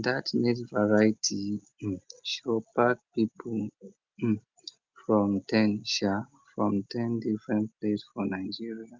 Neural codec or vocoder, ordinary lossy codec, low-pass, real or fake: none; Opus, 32 kbps; 7.2 kHz; real